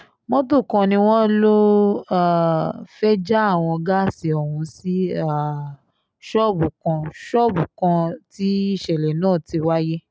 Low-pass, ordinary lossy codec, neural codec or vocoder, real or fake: none; none; none; real